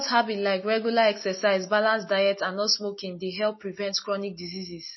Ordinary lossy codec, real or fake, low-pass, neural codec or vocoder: MP3, 24 kbps; real; 7.2 kHz; none